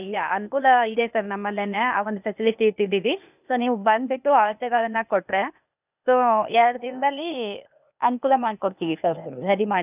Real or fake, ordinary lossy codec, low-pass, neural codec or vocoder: fake; none; 3.6 kHz; codec, 16 kHz, 0.8 kbps, ZipCodec